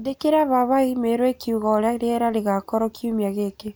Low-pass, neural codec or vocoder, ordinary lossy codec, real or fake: none; none; none; real